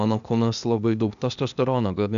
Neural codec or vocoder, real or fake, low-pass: codec, 16 kHz, 0.8 kbps, ZipCodec; fake; 7.2 kHz